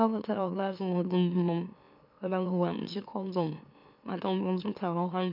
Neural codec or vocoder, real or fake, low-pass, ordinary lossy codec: autoencoder, 44.1 kHz, a latent of 192 numbers a frame, MeloTTS; fake; 5.4 kHz; none